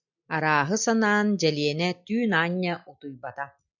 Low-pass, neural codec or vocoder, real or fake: 7.2 kHz; none; real